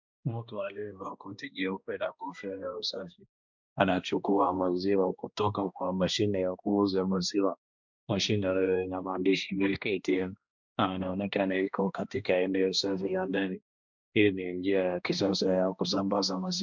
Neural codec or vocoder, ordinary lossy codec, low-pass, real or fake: codec, 16 kHz, 1 kbps, X-Codec, HuBERT features, trained on balanced general audio; MP3, 64 kbps; 7.2 kHz; fake